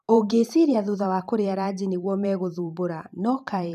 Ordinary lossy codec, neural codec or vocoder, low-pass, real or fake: none; vocoder, 44.1 kHz, 128 mel bands every 512 samples, BigVGAN v2; 14.4 kHz; fake